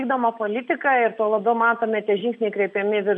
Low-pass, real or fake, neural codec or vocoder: 10.8 kHz; real; none